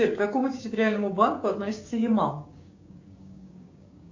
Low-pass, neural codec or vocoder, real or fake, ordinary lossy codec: 7.2 kHz; codec, 16 kHz, 6 kbps, DAC; fake; MP3, 48 kbps